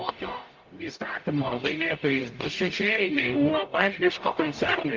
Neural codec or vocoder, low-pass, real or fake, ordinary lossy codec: codec, 44.1 kHz, 0.9 kbps, DAC; 7.2 kHz; fake; Opus, 32 kbps